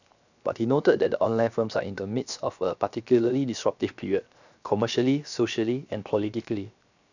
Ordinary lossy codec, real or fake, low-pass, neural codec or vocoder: none; fake; 7.2 kHz; codec, 16 kHz, 0.7 kbps, FocalCodec